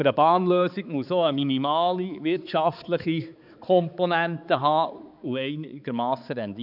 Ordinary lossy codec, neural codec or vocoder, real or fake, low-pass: none; codec, 16 kHz, 4 kbps, X-Codec, HuBERT features, trained on balanced general audio; fake; 5.4 kHz